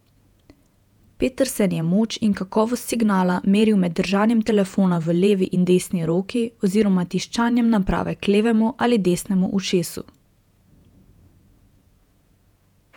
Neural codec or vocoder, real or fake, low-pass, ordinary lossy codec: vocoder, 48 kHz, 128 mel bands, Vocos; fake; 19.8 kHz; none